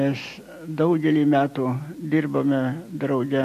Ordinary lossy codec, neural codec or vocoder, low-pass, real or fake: MP3, 64 kbps; none; 14.4 kHz; real